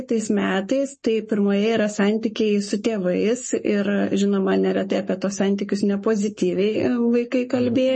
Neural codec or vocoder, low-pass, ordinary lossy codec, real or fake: codec, 44.1 kHz, 7.8 kbps, Pupu-Codec; 10.8 kHz; MP3, 32 kbps; fake